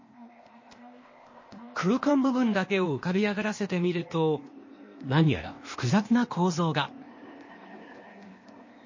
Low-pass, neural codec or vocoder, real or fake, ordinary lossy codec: 7.2 kHz; codec, 16 kHz, 0.8 kbps, ZipCodec; fake; MP3, 32 kbps